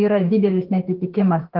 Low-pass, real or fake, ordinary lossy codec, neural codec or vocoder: 5.4 kHz; fake; Opus, 16 kbps; autoencoder, 48 kHz, 32 numbers a frame, DAC-VAE, trained on Japanese speech